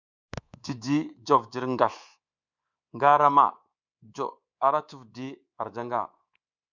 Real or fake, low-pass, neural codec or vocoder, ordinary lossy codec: fake; 7.2 kHz; codec, 24 kHz, 3.1 kbps, DualCodec; Opus, 64 kbps